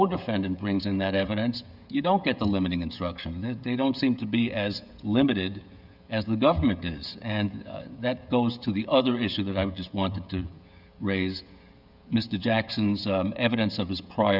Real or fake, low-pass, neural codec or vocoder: fake; 5.4 kHz; codec, 16 kHz, 16 kbps, FreqCodec, smaller model